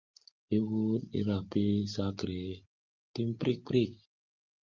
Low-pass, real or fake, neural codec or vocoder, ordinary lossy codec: 7.2 kHz; real; none; Opus, 32 kbps